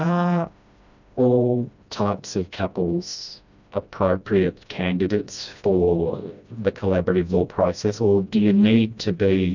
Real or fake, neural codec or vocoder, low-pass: fake; codec, 16 kHz, 1 kbps, FreqCodec, smaller model; 7.2 kHz